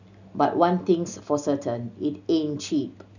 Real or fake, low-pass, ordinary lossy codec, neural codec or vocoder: real; 7.2 kHz; none; none